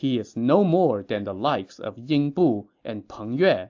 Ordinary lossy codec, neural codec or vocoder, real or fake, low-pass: AAC, 48 kbps; none; real; 7.2 kHz